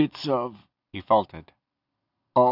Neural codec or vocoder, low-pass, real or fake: none; 5.4 kHz; real